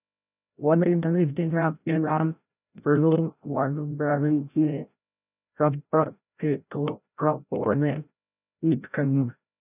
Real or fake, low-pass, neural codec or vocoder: fake; 3.6 kHz; codec, 16 kHz, 0.5 kbps, FreqCodec, larger model